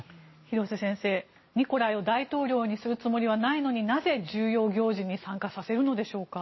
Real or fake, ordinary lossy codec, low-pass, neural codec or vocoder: real; MP3, 24 kbps; 7.2 kHz; none